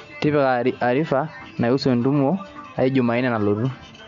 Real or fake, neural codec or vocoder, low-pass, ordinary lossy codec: real; none; 7.2 kHz; MP3, 64 kbps